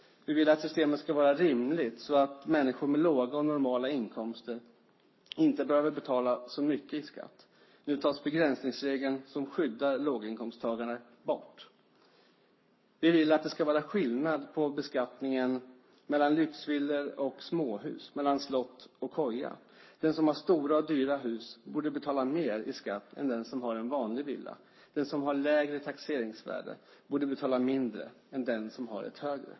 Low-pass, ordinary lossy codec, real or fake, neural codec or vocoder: 7.2 kHz; MP3, 24 kbps; fake; codec, 44.1 kHz, 7.8 kbps, Pupu-Codec